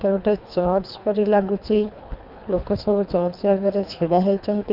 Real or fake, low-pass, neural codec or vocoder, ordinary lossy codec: fake; 5.4 kHz; codec, 24 kHz, 3 kbps, HILCodec; none